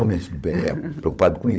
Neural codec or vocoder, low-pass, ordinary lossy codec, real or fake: codec, 16 kHz, 16 kbps, FunCodec, trained on LibriTTS, 50 frames a second; none; none; fake